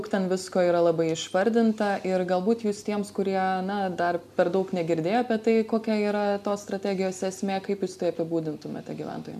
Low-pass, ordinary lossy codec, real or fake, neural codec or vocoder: 14.4 kHz; MP3, 96 kbps; real; none